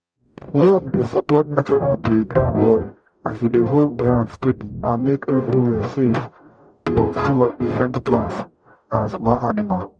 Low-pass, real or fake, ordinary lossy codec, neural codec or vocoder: 9.9 kHz; fake; none; codec, 44.1 kHz, 0.9 kbps, DAC